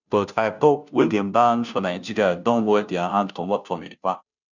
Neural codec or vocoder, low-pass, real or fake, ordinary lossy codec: codec, 16 kHz, 0.5 kbps, FunCodec, trained on Chinese and English, 25 frames a second; 7.2 kHz; fake; none